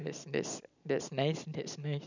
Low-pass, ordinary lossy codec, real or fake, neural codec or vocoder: 7.2 kHz; none; real; none